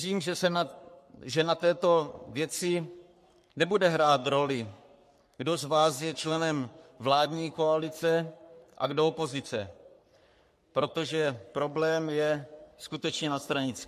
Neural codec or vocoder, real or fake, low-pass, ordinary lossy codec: codec, 44.1 kHz, 3.4 kbps, Pupu-Codec; fake; 14.4 kHz; MP3, 64 kbps